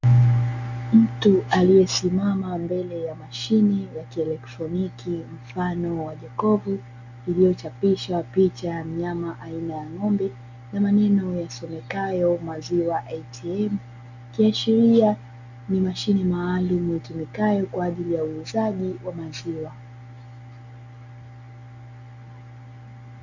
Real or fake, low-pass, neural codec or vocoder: real; 7.2 kHz; none